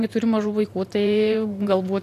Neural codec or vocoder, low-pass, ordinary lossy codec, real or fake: vocoder, 44.1 kHz, 128 mel bands every 512 samples, BigVGAN v2; 14.4 kHz; AAC, 64 kbps; fake